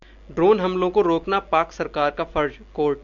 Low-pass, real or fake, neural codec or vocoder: 7.2 kHz; real; none